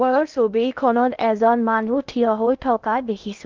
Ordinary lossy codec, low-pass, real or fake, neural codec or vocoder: Opus, 32 kbps; 7.2 kHz; fake; codec, 16 kHz in and 24 kHz out, 0.6 kbps, FocalCodec, streaming, 2048 codes